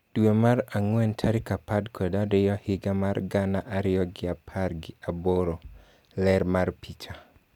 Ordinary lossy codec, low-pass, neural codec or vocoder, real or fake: none; 19.8 kHz; none; real